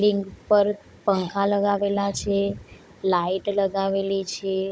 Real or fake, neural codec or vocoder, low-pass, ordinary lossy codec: fake; codec, 16 kHz, 16 kbps, FunCodec, trained on Chinese and English, 50 frames a second; none; none